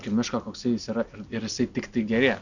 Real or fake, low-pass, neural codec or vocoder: real; 7.2 kHz; none